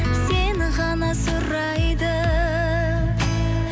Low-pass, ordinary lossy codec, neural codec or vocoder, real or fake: none; none; none; real